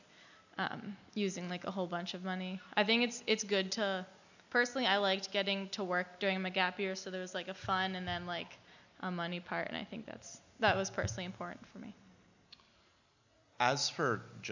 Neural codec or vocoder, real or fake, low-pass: none; real; 7.2 kHz